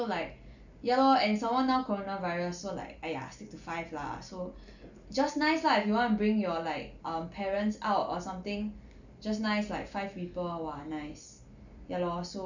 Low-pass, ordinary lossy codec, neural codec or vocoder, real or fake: 7.2 kHz; none; none; real